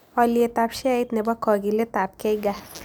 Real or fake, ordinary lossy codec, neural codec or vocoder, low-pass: real; none; none; none